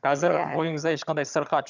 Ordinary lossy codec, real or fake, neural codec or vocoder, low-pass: none; fake; vocoder, 22.05 kHz, 80 mel bands, HiFi-GAN; 7.2 kHz